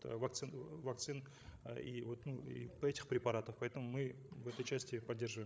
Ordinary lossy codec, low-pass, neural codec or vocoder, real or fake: none; none; codec, 16 kHz, 16 kbps, FreqCodec, larger model; fake